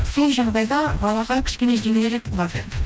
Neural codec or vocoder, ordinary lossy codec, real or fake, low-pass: codec, 16 kHz, 1 kbps, FreqCodec, smaller model; none; fake; none